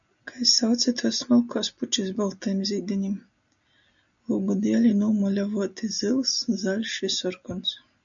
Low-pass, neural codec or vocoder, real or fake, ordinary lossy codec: 7.2 kHz; none; real; MP3, 96 kbps